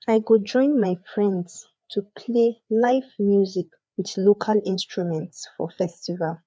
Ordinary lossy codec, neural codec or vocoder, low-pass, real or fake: none; codec, 16 kHz, 4 kbps, FreqCodec, larger model; none; fake